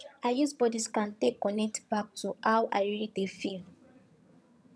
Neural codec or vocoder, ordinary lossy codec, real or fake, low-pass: vocoder, 22.05 kHz, 80 mel bands, HiFi-GAN; none; fake; none